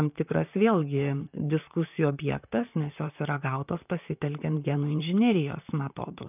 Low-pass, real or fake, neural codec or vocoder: 3.6 kHz; fake; vocoder, 44.1 kHz, 128 mel bands, Pupu-Vocoder